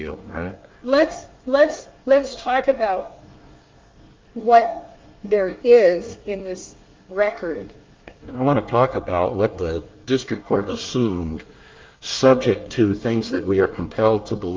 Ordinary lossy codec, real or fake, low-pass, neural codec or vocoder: Opus, 24 kbps; fake; 7.2 kHz; codec, 24 kHz, 1 kbps, SNAC